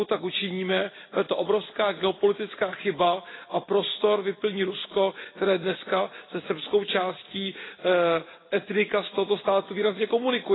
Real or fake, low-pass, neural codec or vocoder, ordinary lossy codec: real; 7.2 kHz; none; AAC, 16 kbps